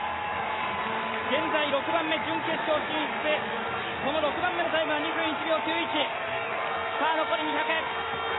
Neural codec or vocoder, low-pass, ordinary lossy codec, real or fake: none; 7.2 kHz; AAC, 16 kbps; real